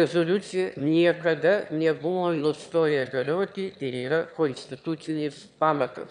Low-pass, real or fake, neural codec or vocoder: 9.9 kHz; fake; autoencoder, 22.05 kHz, a latent of 192 numbers a frame, VITS, trained on one speaker